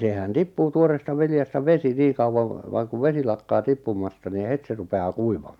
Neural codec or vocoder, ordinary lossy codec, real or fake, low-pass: none; none; real; 19.8 kHz